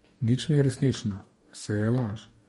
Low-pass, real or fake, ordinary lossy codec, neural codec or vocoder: 19.8 kHz; fake; MP3, 48 kbps; codec, 44.1 kHz, 2.6 kbps, DAC